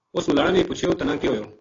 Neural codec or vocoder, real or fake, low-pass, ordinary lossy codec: none; real; 7.2 kHz; AAC, 32 kbps